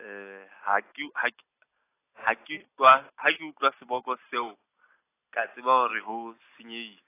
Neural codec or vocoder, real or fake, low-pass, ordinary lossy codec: none; real; 3.6 kHz; AAC, 24 kbps